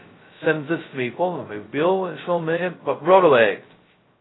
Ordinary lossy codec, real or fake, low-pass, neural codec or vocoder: AAC, 16 kbps; fake; 7.2 kHz; codec, 16 kHz, 0.2 kbps, FocalCodec